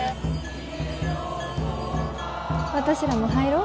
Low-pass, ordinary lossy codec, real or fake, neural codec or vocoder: none; none; real; none